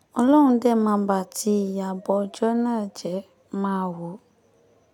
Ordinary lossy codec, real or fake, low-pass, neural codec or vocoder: none; real; 19.8 kHz; none